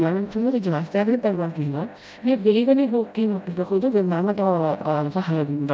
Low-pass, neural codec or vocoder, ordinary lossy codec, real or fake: none; codec, 16 kHz, 0.5 kbps, FreqCodec, smaller model; none; fake